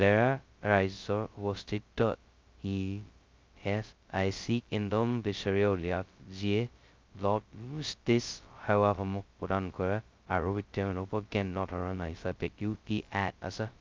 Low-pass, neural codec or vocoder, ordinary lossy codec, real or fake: 7.2 kHz; codec, 16 kHz, 0.2 kbps, FocalCodec; Opus, 24 kbps; fake